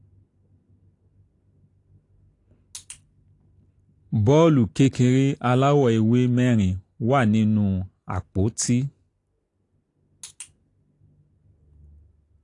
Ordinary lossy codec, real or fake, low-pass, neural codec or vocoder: AAC, 48 kbps; real; 10.8 kHz; none